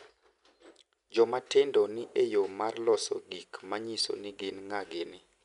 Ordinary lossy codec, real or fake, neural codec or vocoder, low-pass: none; real; none; 10.8 kHz